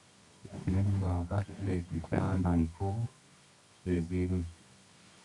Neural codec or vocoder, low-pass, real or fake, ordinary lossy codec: codec, 24 kHz, 0.9 kbps, WavTokenizer, medium music audio release; 10.8 kHz; fake; Opus, 64 kbps